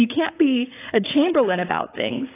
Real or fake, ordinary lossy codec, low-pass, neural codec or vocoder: fake; AAC, 16 kbps; 3.6 kHz; codec, 16 kHz, 8 kbps, FreqCodec, larger model